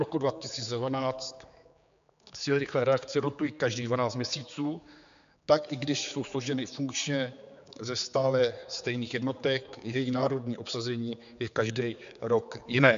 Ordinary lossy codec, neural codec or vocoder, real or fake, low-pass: MP3, 64 kbps; codec, 16 kHz, 4 kbps, X-Codec, HuBERT features, trained on general audio; fake; 7.2 kHz